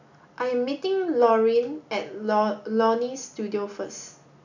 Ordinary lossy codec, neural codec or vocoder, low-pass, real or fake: none; none; 7.2 kHz; real